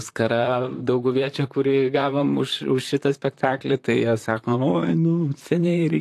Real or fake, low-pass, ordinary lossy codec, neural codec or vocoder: fake; 14.4 kHz; AAC, 64 kbps; vocoder, 44.1 kHz, 128 mel bands, Pupu-Vocoder